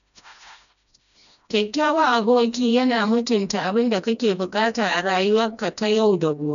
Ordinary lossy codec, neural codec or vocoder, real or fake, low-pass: none; codec, 16 kHz, 1 kbps, FreqCodec, smaller model; fake; 7.2 kHz